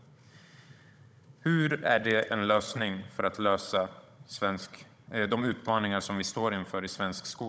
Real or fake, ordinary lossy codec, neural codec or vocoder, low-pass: fake; none; codec, 16 kHz, 16 kbps, FunCodec, trained on Chinese and English, 50 frames a second; none